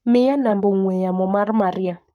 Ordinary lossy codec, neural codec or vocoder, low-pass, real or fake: none; codec, 44.1 kHz, 7.8 kbps, Pupu-Codec; 19.8 kHz; fake